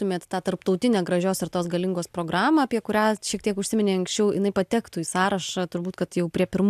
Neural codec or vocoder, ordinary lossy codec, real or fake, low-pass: none; AAC, 96 kbps; real; 14.4 kHz